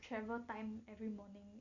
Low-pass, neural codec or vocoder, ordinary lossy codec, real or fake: 7.2 kHz; none; MP3, 48 kbps; real